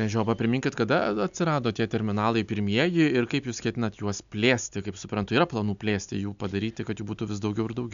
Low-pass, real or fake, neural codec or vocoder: 7.2 kHz; real; none